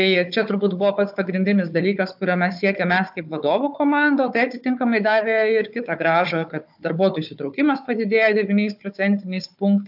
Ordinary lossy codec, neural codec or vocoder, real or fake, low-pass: AAC, 48 kbps; codec, 16 kHz, 4 kbps, FunCodec, trained on Chinese and English, 50 frames a second; fake; 5.4 kHz